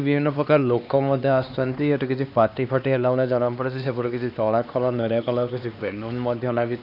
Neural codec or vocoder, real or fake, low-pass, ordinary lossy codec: codec, 16 kHz, 2 kbps, X-Codec, HuBERT features, trained on LibriSpeech; fake; 5.4 kHz; none